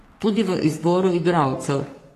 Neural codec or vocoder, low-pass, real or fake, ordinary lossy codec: codec, 44.1 kHz, 3.4 kbps, Pupu-Codec; 14.4 kHz; fake; AAC, 48 kbps